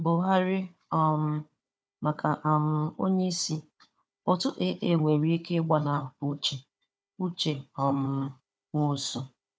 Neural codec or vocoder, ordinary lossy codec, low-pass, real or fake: codec, 16 kHz, 4 kbps, FunCodec, trained on Chinese and English, 50 frames a second; none; none; fake